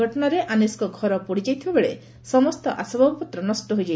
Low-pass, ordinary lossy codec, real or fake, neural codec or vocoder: none; none; real; none